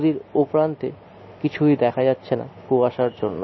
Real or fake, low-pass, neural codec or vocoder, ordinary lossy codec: real; 7.2 kHz; none; MP3, 24 kbps